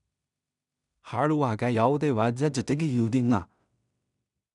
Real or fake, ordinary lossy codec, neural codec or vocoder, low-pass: fake; none; codec, 16 kHz in and 24 kHz out, 0.4 kbps, LongCat-Audio-Codec, two codebook decoder; 10.8 kHz